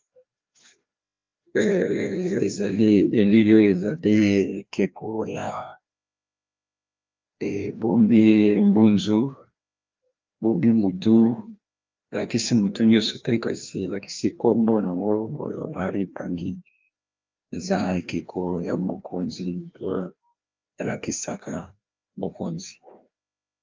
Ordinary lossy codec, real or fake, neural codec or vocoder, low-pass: Opus, 24 kbps; fake; codec, 16 kHz, 1 kbps, FreqCodec, larger model; 7.2 kHz